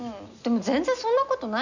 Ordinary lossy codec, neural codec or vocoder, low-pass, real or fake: none; none; 7.2 kHz; real